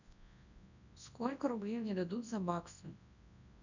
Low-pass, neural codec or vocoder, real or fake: 7.2 kHz; codec, 24 kHz, 0.9 kbps, WavTokenizer, large speech release; fake